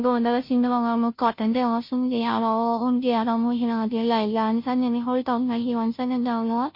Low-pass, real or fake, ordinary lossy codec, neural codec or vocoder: 5.4 kHz; fake; MP3, 32 kbps; codec, 16 kHz, 0.5 kbps, FunCodec, trained on Chinese and English, 25 frames a second